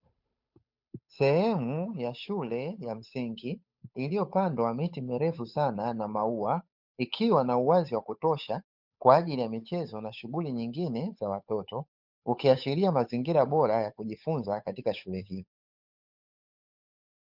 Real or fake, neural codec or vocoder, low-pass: fake; codec, 16 kHz, 8 kbps, FunCodec, trained on Chinese and English, 25 frames a second; 5.4 kHz